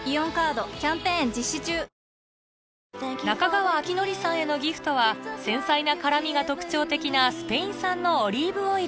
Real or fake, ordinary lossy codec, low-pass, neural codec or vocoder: real; none; none; none